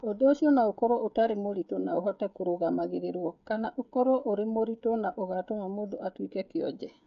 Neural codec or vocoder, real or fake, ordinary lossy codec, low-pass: codec, 16 kHz, 16 kbps, FreqCodec, smaller model; fake; none; 7.2 kHz